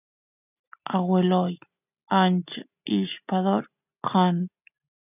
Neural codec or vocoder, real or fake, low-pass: none; real; 3.6 kHz